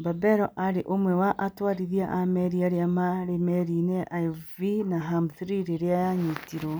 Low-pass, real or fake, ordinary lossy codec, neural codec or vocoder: none; real; none; none